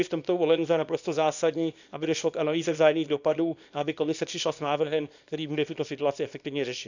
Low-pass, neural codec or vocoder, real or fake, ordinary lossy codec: 7.2 kHz; codec, 24 kHz, 0.9 kbps, WavTokenizer, small release; fake; none